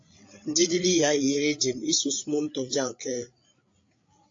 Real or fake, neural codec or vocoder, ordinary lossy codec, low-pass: fake; codec, 16 kHz, 8 kbps, FreqCodec, larger model; AAC, 48 kbps; 7.2 kHz